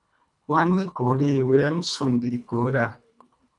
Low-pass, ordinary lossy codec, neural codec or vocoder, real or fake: 10.8 kHz; MP3, 96 kbps; codec, 24 kHz, 1.5 kbps, HILCodec; fake